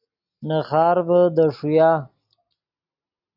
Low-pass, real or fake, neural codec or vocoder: 5.4 kHz; real; none